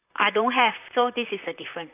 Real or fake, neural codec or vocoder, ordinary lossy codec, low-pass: fake; vocoder, 44.1 kHz, 128 mel bands, Pupu-Vocoder; none; 3.6 kHz